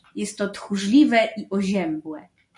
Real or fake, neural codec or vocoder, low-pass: real; none; 10.8 kHz